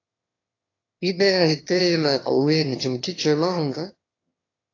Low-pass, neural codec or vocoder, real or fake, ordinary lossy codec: 7.2 kHz; autoencoder, 22.05 kHz, a latent of 192 numbers a frame, VITS, trained on one speaker; fake; AAC, 32 kbps